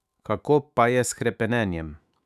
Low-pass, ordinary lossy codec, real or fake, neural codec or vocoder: 14.4 kHz; none; fake; autoencoder, 48 kHz, 128 numbers a frame, DAC-VAE, trained on Japanese speech